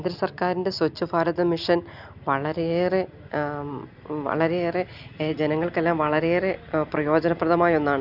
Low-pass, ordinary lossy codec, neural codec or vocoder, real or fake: 5.4 kHz; none; none; real